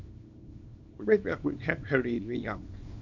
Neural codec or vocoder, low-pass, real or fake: codec, 24 kHz, 0.9 kbps, WavTokenizer, small release; 7.2 kHz; fake